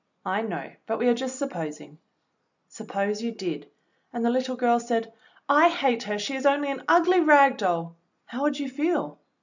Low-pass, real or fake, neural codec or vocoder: 7.2 kHz; real; none